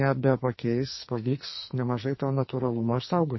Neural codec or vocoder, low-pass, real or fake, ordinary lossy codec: codec, 44.1 kHz, 2.6 kbps, SNAC; 7.2 kHz; fake; MP3, 24 kbps